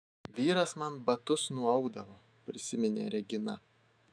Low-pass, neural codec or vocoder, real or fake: 9.9 kHz; autoencoder, 48 kHz, 128 numbers a frame, DAC-VAE, trained on Japanese speech; fake